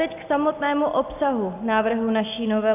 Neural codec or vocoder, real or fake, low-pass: none; real; 3.6 kHz